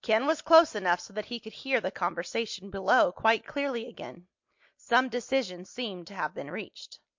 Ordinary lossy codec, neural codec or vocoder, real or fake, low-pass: MP3, 48 kbps; none; real; 7.2 kHz